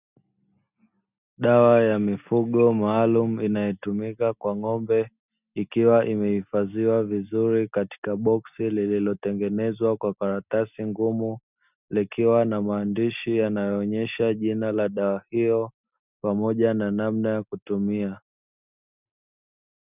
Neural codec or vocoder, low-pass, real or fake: none; 3.6 kHz; real